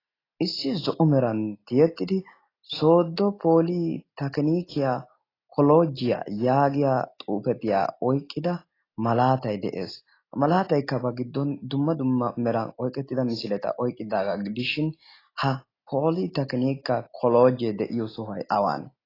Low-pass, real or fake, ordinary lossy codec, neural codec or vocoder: 5.4 kHz; real; AAC, 24 kbps; none